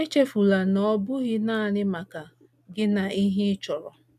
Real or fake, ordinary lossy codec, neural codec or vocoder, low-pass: real; none; none; 14.4 kHz